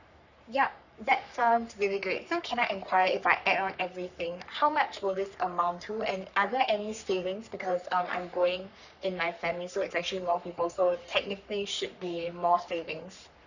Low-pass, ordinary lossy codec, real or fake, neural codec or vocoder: 7.2 kHz; none; fake; codec, 44.1 kHz, 3.4 kbps, Pupu-Codec